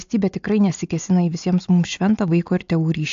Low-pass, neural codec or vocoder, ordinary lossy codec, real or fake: 7.2 kHz; none; AAC, 64 kbps; real